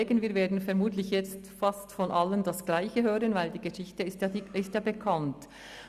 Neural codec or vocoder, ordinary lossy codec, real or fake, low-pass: none; Opus, 64 kbps; real; 14.4 kHz